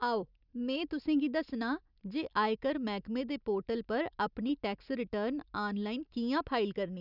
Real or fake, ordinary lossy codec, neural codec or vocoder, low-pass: real; none; none; 5.4 kHz